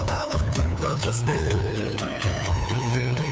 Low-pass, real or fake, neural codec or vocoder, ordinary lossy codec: none; fake; codec, 16 kHz, 2 kbps, FunCodec, trained on LibriTTS, 25 frames a second; none